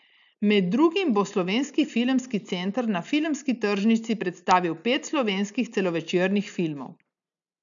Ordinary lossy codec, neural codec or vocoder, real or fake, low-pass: none; none; real; 7.2 kHz